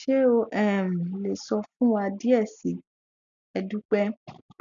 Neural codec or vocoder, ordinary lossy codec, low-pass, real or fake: none; none; 7.2 kHz; real